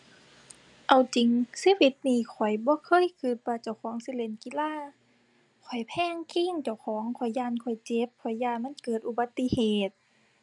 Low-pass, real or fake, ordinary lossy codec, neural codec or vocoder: 10.8 kHz; real; none; none